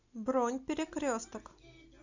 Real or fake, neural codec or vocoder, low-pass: real; none; 7.2 kHz